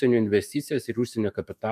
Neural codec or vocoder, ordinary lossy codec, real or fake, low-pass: autoencoder, 48 kHz, 128 numbers a frame, DAC-VAE, trained on Japanese speech; MP3, 96 kbps; fake; 14.4 kHz